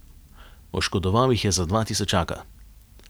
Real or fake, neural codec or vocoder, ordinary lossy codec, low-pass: real; none; none; none